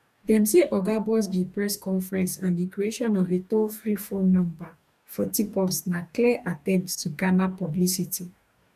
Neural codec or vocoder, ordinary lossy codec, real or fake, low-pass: codec, 44.1 kHz, 2.6 kbps, DAC; none; fake; 14.4 kHz